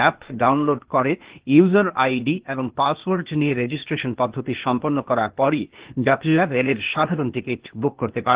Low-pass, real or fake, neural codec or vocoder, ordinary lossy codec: 3.6 kHz; fake; codec, 16 kHz, 0.8 kbps, ZipCodec; Opus, 16 kbps